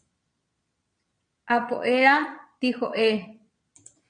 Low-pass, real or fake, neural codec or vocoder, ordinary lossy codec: 9.9 kHz; fake; vocoder, 22.05 kHz, 80 mel bands, Vocos; MP3, 64 kbps